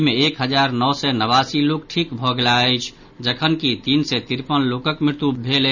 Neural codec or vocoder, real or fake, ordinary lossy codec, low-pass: none; real; none; 7.2 kHz